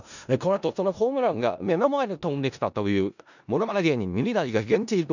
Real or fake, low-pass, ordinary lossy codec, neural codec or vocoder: fake; 7.2 kHz; none; codec, 16 kHz in and 24 kHz out, 0.4 kbps, LongCat-Audio-Codec, four codebook decoder